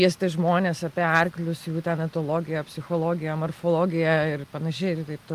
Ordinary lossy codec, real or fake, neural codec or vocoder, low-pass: Opus, 32 kbps; real; none; 14.4 kHz